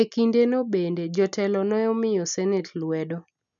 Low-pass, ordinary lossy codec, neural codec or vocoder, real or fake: 7.2 kHz; MP3, 96 kbps; none; real